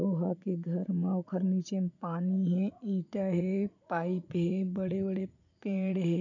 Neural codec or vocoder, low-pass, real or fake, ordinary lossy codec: vocoder, 44.1 kHz, 80 mel bands, Vocos; 7.2 kHz; fake; none